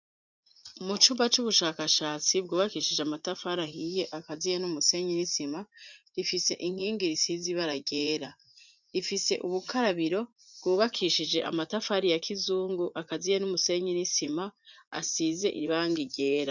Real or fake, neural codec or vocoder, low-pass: fake; vocoder, 44.1 kHz, 80 mel bands, Vocos; 7.2 kHz